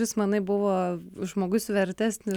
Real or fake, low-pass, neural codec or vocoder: real; 19.8 kHz; none